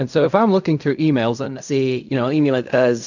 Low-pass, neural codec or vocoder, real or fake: 7.2 kHz; codec, 16 kHz in and 24 kHz out, 0.4 kbps, LongCat-Audio-Codec, fine tuned four codebook decoder; fake